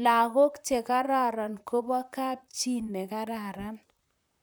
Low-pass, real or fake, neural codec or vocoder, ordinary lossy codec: none; fake; vocoder, 44.1 kHz, 128 mel bands, Pupu-Vocoder; none